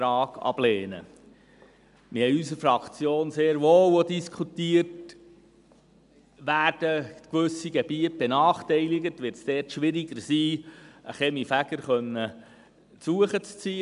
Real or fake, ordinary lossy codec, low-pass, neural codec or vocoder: real; none; 10.8 kHz; none